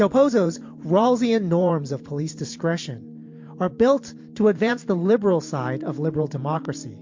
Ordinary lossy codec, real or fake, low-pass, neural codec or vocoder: MP3, 48 kbps; fake; 7.2 kHz; vocoder, 44.1 kHz, 128 mel bands every 512 samples, BigVGAN v2